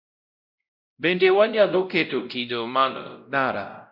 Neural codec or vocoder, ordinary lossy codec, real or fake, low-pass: codec, 16 kHz, 0.5 kbps, X-Codec, WavLM features, trained on Multilingual LibriSpeech; Opus, 64 kbps; fake; 5.4 kHz